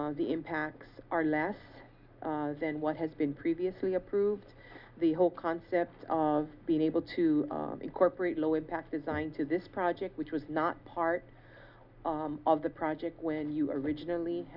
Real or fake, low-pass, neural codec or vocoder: real; 5.4 kHz; none